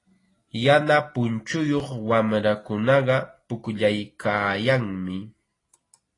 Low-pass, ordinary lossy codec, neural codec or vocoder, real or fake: 10.8 kHz; AAC, 32 kbps; none; real